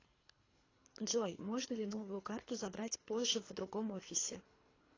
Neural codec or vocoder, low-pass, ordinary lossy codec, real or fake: codec, 24 kHz, 3 kbps, HILCodec; 7.2 kHz; AAC, 32 kbps; fake